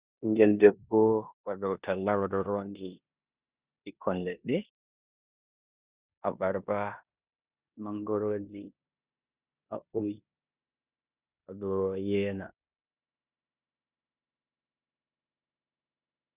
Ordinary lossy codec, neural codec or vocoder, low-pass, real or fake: Opus, 64 kbps; codec, 16 kHz in and 24 kHz out, 0.9 kbps, LongCat-Audio-Codec, four codebook decoder; 3.6 kHz; fake